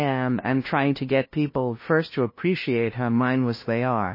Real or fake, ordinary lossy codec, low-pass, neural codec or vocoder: fake; MP3, 24 kbps; 5.4 kHz; codec, 16 kHz, 0.5 kbps, FunCodec, trained on LibriTTS, 25 frames a second